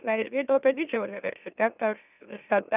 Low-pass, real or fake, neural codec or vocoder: 3.6 kHz; fake; autoencoder, 44.1 kHz, a latent of 192 numbers a frame, MeloTTS